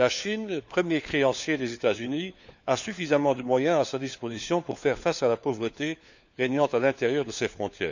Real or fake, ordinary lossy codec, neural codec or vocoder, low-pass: fake; none; codec, 16 kHz, 4 kbps, FunCodec, trained on LibriTTS, 50 frames a second; 7.2 kHz